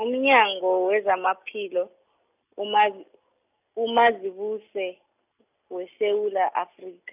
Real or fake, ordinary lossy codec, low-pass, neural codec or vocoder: real; none; 3.6 kHz; none